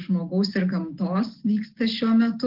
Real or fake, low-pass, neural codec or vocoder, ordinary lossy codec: real; 5.4 kHz; none; Opus, 16 kbps